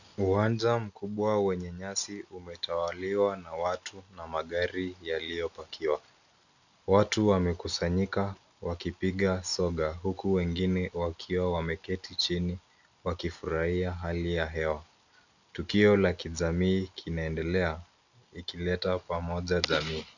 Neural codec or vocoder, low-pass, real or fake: none; 7.2 kHz; real